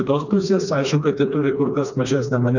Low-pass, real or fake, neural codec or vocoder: 7.2 kHz; fake; codec, 16 kHz, 2 kbps, FreqCodec, smaller model